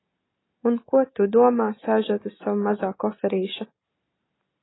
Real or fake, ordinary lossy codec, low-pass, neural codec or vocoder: real; AAC, 16 kbps; 7.2 kHz; none